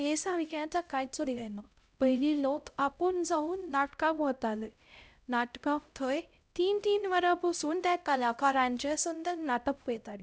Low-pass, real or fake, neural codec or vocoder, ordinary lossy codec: none; fake; codec, 16 kHz, 0.5 kbps, X-Codec, HuBERT features, trained on LibriSpeech; none